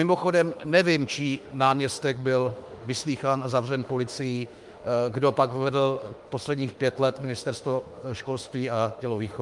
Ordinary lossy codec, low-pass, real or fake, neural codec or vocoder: Opus, 32 kbps; 10.8 kHz; fake; autoencoder, 48 kHz, 32 numbers a frame, DAC-VAE, trained on Japanese speech